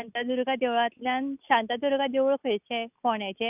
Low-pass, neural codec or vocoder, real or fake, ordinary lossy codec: 3.6 kHz; none; real; none